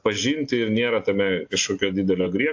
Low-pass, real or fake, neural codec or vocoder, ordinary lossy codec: 7.2 kHz; real; none; AAC, 48 kbps